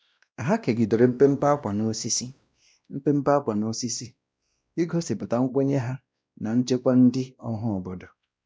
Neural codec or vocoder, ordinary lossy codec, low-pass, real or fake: codec, 16 kHz, 1 kbps, X-Codec, WavLM features, trained on Multilingual LibriSpeech; none; none; fake